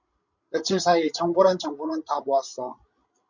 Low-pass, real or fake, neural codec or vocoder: 7.2 kHz; fake; codec, 16 kHz, 16 kbps, FreqCodec, larger model